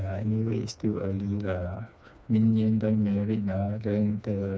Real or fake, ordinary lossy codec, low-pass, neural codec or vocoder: fake; none; none; codec, 16 kHz, 2 kbps, FreqCodec, smaller model